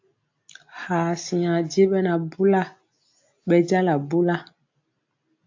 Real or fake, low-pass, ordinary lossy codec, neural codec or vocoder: real; 7.2 kHz; AAC, 48 kbps; none